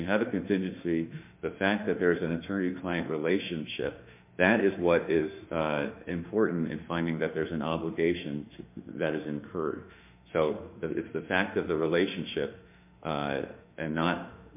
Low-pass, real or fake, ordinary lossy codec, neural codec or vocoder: 3.6 kHz; fake; MP3, 32 kbps; autoencoder, 48 kHz, 32 numbers a frame, DAC-VAE, trained on Japanese speech